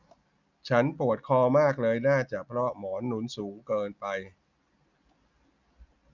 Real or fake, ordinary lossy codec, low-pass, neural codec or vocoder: real; none; 7.2 kHz; none